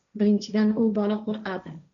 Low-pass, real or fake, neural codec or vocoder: 7.2 kHz; fake; codec, 16 kHz, 1.1 kbps, Voila-Tokenizer